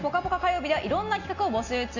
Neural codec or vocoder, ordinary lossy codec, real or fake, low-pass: none; none; real; 7.2 kHz